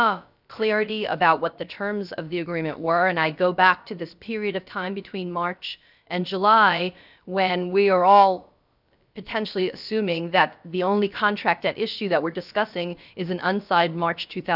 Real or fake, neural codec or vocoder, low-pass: fake; codec, 16 kHz, about 1 kbps, DyCAST, with the encoder's durations; 5.4 kHz